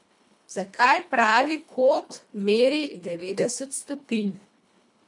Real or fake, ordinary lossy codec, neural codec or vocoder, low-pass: fake; MP3, 64 kbps; codec, 24 kHz, 1.5 kbps, HILCodec; 10.8 kHz